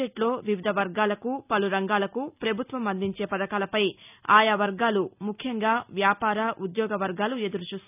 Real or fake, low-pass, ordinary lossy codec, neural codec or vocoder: real; 3.6 kHz; none; none